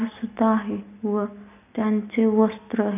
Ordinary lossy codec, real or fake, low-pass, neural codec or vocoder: none; real; 3.6 kHz; none